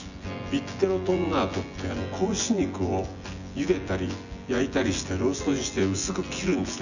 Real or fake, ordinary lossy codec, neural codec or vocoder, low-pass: fake; none; vocoder, 24 kHz, 100 mel bands, Vocos; 7.2 kHz